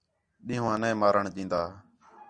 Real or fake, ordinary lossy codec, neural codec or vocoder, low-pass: real; Opus, 64 kbps; none; 9.9 kHz